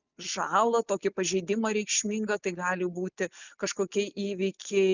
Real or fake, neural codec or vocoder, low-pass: real; none; 7.2 kHz